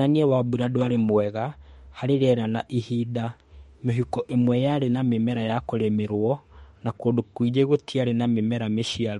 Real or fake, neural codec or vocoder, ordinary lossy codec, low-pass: fake; autoencoder, 48 kHz, 32 numbers a frame, DAC-VAE, trained on Japanese speech; MP3, 48 kbps; 19.8 kHz